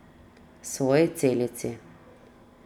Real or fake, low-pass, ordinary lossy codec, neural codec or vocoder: real; 19.8 kHz; none; none